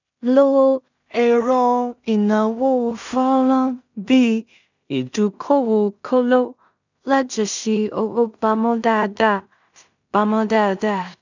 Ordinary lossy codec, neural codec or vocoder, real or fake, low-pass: none; codec, 16 kHz in and 24 kHz out, 0.4 kbps, LongCat-Audio-Codec, two codebook decoder; fake; 7.2 kHz